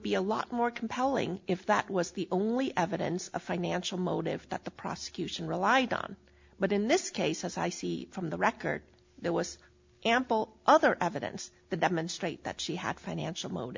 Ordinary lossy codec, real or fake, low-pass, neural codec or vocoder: MP3, 64 kbps; real; 7.2 kHz; none